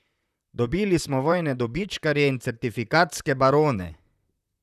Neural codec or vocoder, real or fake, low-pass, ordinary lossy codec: vocoder, 44.1 kHz, 128 mel bands, Pupu-Vocoder; fake; 14.4 kHz; none